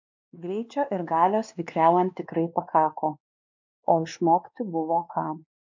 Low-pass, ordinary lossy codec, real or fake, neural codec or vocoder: 7.2 kHz; MP3, 64 kbps; fake; codec, 16 kHz, 2 kbps, X-Codec, WavLM features, trained on Multilingual LibriSpeech